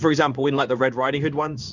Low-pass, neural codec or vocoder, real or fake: 7.2 kHz; codec, 16 kHz in and 24 kHz out, 1 kbps, XY-Tokenizer; fake